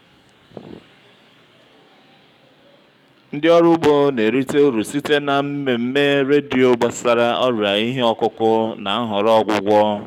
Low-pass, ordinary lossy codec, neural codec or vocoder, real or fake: 19.8 kHz; none; codec, 44.1 kHz, 7.8 kbps, DAC; fake